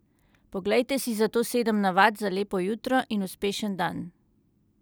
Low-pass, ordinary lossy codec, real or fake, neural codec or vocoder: none; none; real; none